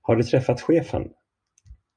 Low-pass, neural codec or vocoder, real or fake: 9.9 kHz; none; real